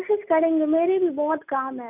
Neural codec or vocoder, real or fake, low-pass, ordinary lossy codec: none; real; 3.6 kHz; none